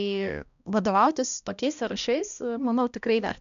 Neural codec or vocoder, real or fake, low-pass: codec, 16 kHz, 1 kbps, X-Codec, HuBERT features, trained on balanced general audio; fake; 7.2 kHz